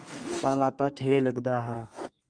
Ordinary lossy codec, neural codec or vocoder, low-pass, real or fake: Opus, 32 kbps; codec, 32 kHz, 1.9 kbps, SNAC; 9.9 kHz; fake